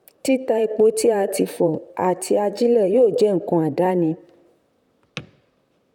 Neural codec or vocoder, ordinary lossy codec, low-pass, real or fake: vocoder, 44.1 kHz, 128 mel bands, Pupu-Vocoder; none; 19.8 kHz; fake